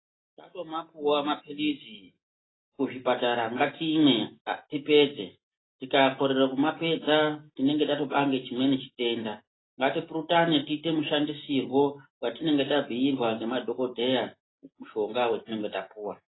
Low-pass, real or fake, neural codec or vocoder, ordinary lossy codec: 7.2 kHz; real; none; AAC, 16 kbps